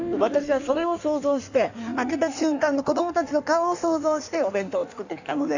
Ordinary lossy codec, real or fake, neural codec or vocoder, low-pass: none; fake; codec, 16 kHz in and 24 kHz out, 1.1 kbps, FireRedTTS-2 codec; 7.2 kHz